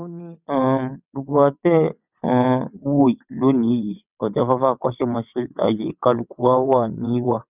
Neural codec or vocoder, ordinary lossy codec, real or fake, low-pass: vocoder, 22.05 kHz, 80 mel bands, WaveNeXt; none; fake; 3.6 kHz